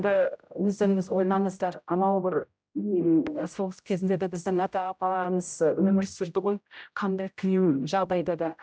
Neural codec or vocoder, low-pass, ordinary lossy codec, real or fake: codec, 16 kHz, 0.5 kbps, X-Codec, HuBERT features, trained on general audio; none; none; fake